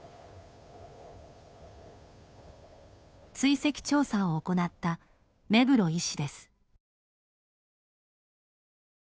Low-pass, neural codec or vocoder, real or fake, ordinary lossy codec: none; codec, 16 kHz, 2 kbps, FunCodec, trained on Chinese and English, 25 frames a second; fake; none